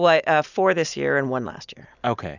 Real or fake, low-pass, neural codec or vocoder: real; 7.2 kHz; none